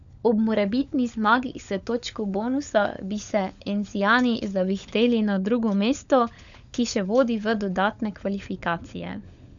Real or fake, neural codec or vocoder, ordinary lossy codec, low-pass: fake; codec, 16 kHz, 16 kbps, FunCodec, trained on LibriTTS, 50 frames a second; none; 7.2 kHz